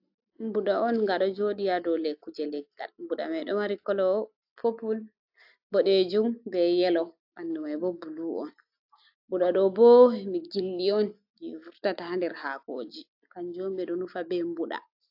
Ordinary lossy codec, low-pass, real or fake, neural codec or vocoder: AAC, 48 kbps; 5.4 kHz; real; none